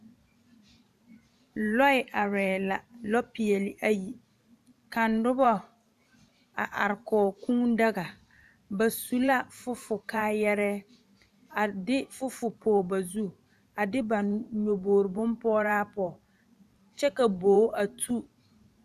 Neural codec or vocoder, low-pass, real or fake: vocoder, 44.1 kHz, 128 mel bands every 512 samples, BigVGAN v2; 14.4 kHz; fake